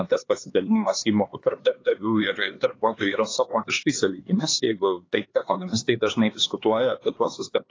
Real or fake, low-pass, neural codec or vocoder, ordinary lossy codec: fake; 7.2 kHz; codec, 16 kHz, 2 kbps, X-Codec, HuBERT features, trained on LibriSpeech; AAC, 32 kbps